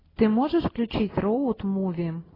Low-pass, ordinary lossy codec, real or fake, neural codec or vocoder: 5.4 kHz; AAC, 24 kbps; real; none